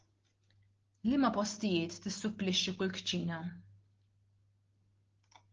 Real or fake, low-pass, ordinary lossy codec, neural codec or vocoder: real; 7.2 kHz; Opus, 16 kbps; none